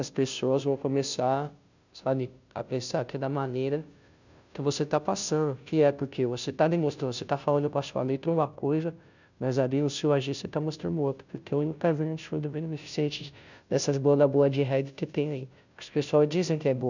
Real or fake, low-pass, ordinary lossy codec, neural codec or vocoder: fake; 7.2 kHz; none; codec, 16 kHz, 0.5 kbps, FunCodec, trained on Chinese and English, 25 frames a second